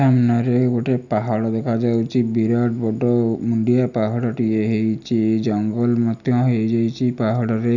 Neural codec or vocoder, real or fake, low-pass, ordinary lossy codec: none; real; 7.2 kHz; none